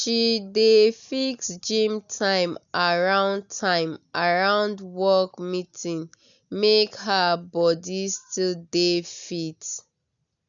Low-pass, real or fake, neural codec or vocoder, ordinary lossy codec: 7.2 kHz; real; none; none